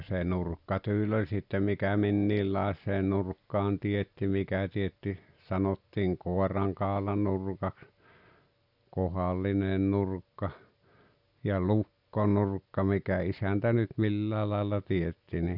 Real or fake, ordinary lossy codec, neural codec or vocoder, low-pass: real; none; none; 5.4 kHz